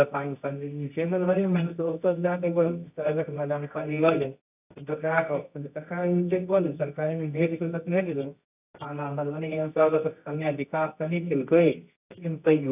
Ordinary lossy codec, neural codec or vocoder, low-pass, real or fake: none; codec, 24 kHz, 0.9 kbps, WavTokenizer, medium music audio release; 3.6 kHz; fake